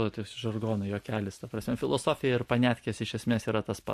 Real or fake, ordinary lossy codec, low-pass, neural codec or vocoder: real; MP3, 64 kbps; 14.4 kHz; none